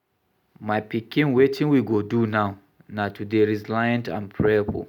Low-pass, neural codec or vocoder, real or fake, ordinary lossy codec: 19.8 kHz; none; real; none